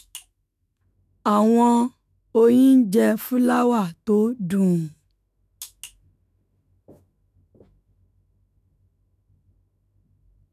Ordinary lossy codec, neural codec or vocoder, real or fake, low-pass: none; autoencoder, 48 kHz, 128 numbers a frame, DAC-VAE, trained on Japanese speech; fake; 14.4 kHz